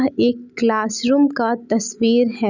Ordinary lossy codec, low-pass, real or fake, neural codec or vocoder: none; 7.2 kHz; real; none